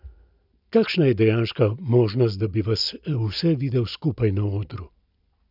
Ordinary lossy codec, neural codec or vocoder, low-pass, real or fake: none; none; 5.4 kHz; real